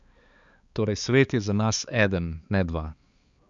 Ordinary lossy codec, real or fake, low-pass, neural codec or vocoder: Opus, 64 kbps; fake; 7.2 kHz; codec, 16 kHz, 4 kbps, X-Codec, HuBERT features, trained on balanced general audio